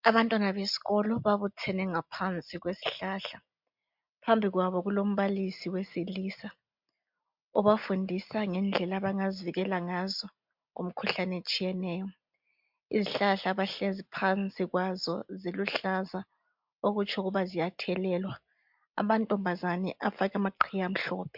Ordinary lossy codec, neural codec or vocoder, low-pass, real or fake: MP3, 48 kbps; none; 5.4 kHz; real